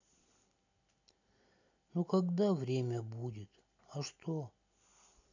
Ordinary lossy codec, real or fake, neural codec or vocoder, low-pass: none; real; none; 7.2 kHz